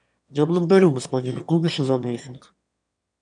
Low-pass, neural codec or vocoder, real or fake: 9.9 kHz; autoencoder, 22.05 kHz, a latent of 192 numbers a frame, VITS, trained on one speaker; fake